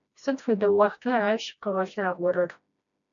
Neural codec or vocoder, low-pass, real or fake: codec, 16 kHz, 1 kbps, FreqCodec, smaller model; 7.2 kHz; fake